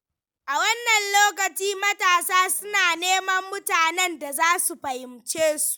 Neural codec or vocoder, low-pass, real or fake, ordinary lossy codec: none; none; real; none